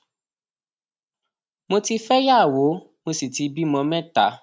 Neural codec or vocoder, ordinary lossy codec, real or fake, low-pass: none; none; real; none